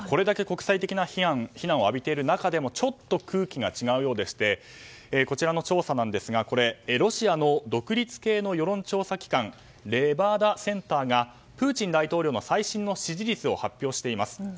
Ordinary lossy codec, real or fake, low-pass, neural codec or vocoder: none; real; none; none